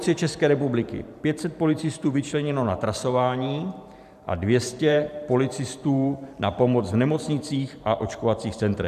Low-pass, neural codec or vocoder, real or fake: 14.4 kHz; vocoder, 44.1 kHz, 128 mel bands every 512 samples, BigVGAN v2; fake